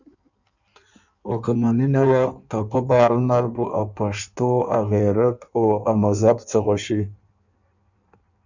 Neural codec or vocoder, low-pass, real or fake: codec, 16 kHz in and 24 kHz out, 1.1 kbps, FireRedTTS-2 codec; 7.2 kHz; fake